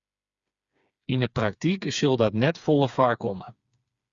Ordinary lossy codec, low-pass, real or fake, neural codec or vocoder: Opus, 64 kbps; 7.2 kHz; fake; codec, 16 kHz, 4 kbps, FreqCodec, smaller model